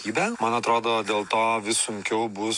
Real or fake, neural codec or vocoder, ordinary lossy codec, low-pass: real; none; MP3, 96 kbps; 10.8 kHz